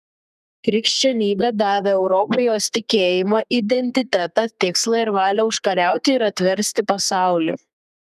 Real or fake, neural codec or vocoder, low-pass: fake; codec, 32 kHz, 1.9 kbps, SNAC; 14.4 kHz